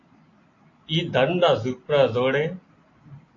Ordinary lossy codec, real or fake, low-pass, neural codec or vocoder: AAC, 32 kbps; real; 7.2 kHz; none